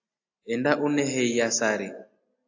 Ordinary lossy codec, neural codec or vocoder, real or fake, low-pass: AAC, 48 kbps; none; real; 7.2 kHz